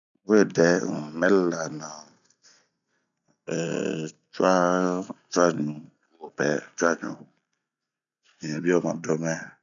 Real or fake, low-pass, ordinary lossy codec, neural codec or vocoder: real; 7.2 kHz; none; none